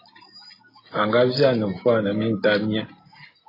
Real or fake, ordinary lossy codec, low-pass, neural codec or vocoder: real; AAC, 24 kbps; 5.4 kHz; none